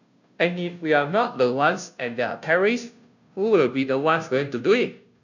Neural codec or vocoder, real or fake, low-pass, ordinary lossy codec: codec, 16 kHz, 0.5 kbps, FunCodec, trained on Chinese and English, 25 frames a second; fake; 7.2 kHz; none